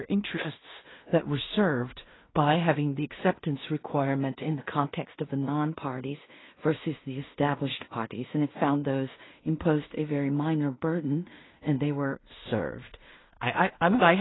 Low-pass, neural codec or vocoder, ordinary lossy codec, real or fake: 7.2 kHz; codec, 16 kHz in and 24 kHz out, 0.4 kbps, LongCat-Audio-Codec, two codebook decoder; AAC, 16 kbps; fake